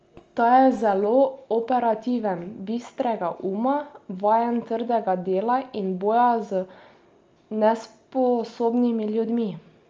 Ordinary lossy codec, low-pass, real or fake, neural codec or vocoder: Opus, 24 kbps; 7.2 kHz; real; none